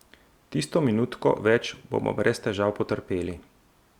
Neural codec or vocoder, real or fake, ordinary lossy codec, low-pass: none; real; Opus, 64 kbps; 19.8 kHz